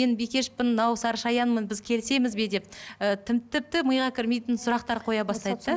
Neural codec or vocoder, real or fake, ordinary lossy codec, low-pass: none; real; none; none